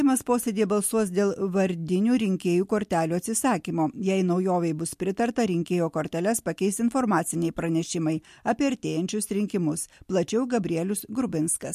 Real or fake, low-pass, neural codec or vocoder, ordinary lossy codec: real; 14.4 kHz; none; MP3, 64 kbps